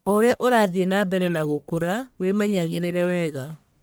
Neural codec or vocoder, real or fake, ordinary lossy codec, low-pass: codec, 44.1 kHz, 1.7 kbps, Pupu-Codec; fake; none; none